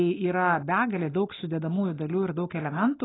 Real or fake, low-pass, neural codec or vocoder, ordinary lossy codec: real; 7.2 kHz; none; AAC, 16 kbps